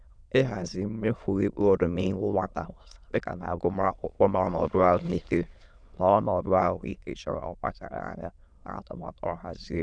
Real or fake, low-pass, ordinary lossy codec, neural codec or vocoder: fake; 9.9 kHz; none; autoencoder, 22.05 kHz, a latent of 192 numbers a frame, VITS, trained on many speakers